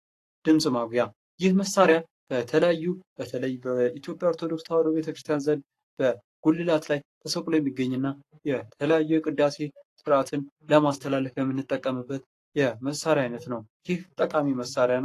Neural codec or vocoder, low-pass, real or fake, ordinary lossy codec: codec, 44.1 kHz, 7.8 kbps, Pupu-Codec; 14.4 kHz; fake; AAC, 48 kbps